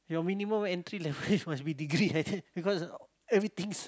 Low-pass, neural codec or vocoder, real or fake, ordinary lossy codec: none; none; real; none